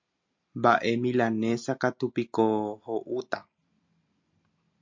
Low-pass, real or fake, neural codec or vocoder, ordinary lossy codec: 7.2 kHz; real; none; MP3, 64 kbps